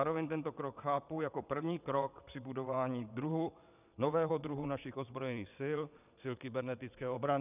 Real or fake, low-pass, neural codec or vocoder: fake; 3.6 kHz; vocoder, 22.05 kHz, 80 mel bands, WaveNeXt